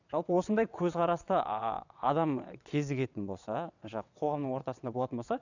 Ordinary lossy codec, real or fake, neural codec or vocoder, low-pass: none; fake; vocoder, 22.05 kHz, 80 mel bands, WaveNeXt; 7.2 kHz